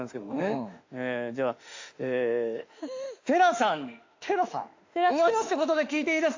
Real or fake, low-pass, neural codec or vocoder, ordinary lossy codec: fake; 7.2 kHz; autoencoder, 48 kHz, 32 numbers a frame, DAC-VAE, trained on Japanese speech; AAC, 48 kbps